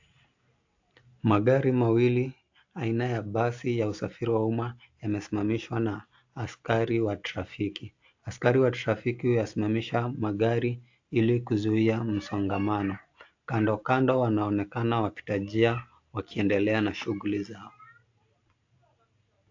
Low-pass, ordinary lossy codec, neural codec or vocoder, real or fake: 7.2 kHz; AAC, 48 kbps; none; real